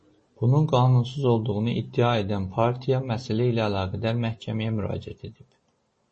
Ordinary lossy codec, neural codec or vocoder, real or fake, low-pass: MP3, 32 kbps; none; real; 10.8 kHz